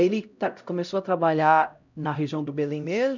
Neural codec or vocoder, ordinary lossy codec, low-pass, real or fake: codec, 16 kHz, 0.5 kbps, X-Codec, HuBERT features, trained on LibriSpeech; none; 7.2 kHz; fake